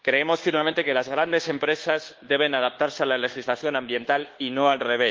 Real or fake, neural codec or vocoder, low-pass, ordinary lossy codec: fake; codec, 24 kHz, 1.2 kbps, DualCodec; 7.2 kHz; Opus, 16 kbps